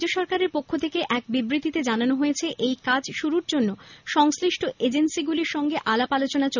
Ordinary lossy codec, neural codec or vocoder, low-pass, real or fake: none; none; 7.2 kHz; real